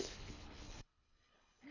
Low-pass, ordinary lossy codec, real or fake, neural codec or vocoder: 7.2 kHz; none; fake; codec, 24 kHz, 3 kbps, HILCodec